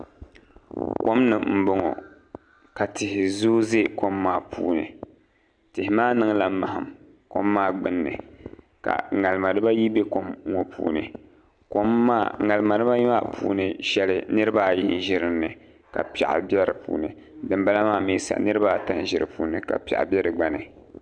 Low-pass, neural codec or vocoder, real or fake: 9.9 kHz; none; real